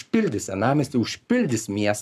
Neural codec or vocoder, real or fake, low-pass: codec, 44.1 kHz, 7.8 kbps, Pupu-Codec; fake; 14.4 kHz